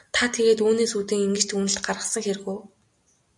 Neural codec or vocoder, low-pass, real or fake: none; 10.8 kHz; real